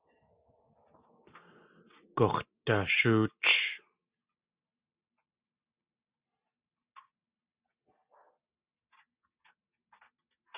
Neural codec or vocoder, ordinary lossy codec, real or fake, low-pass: none; Opus, 64 kbps; real; 3.6 kHz